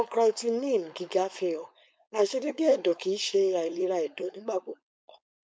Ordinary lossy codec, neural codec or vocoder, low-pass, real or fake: none; codec, 16 kHz, 4.8 kbps, FACodec; none; fake